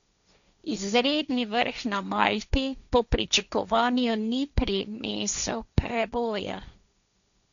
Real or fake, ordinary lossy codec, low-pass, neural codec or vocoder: fake; none; 7.2 kHz; codec, 16 kHz, 1.1 kbps, Voila-Tokenizer